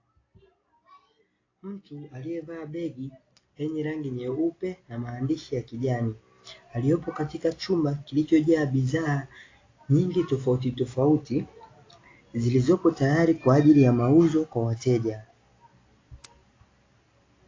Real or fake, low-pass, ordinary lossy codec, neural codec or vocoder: real; 7.2 kHz; AAC, 32 kbps; none